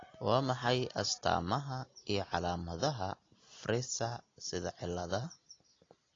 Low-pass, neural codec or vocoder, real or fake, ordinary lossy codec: 7.2 kHz; none; real; AAC, 32 kbps